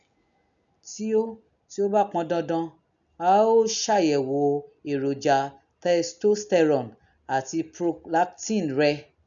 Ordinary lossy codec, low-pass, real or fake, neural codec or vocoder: none; 7.2 kHz; real; none